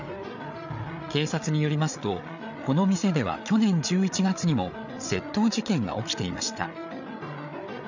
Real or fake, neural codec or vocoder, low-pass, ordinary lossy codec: fake; codec, 16 kHz, 8 kbps, FreqCodec, larger model; 7.2 kHz; none